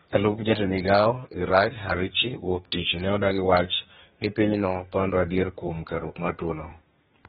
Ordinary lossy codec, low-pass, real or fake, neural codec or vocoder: AAC, 16 kbps; 14.4 kHz; fake; codec, 32 kHz, 1.9 kbps, SNAC